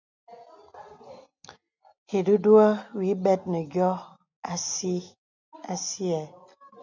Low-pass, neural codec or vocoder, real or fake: 7.2 kHz; none; real